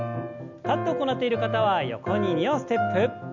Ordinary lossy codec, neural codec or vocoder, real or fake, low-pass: none; none; real; 7.2 kHz